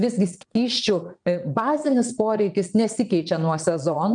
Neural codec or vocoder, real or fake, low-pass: vocoder, 22.05 kHz, 80 mel bands, Vocos; fake; 9.9 kHz